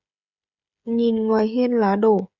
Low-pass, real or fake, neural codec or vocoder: 7.2 kHz; fake; codec, 16 kHz, 16 kbps, FreqCodec, smaller model